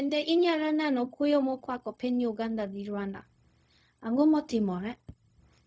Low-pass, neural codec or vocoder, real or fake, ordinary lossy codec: none; codec, 16 kHz, 0.4 kbps, LongCat-Audio-Codec; fake; none